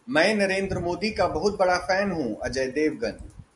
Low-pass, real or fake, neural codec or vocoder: 10.8 kHz; real; none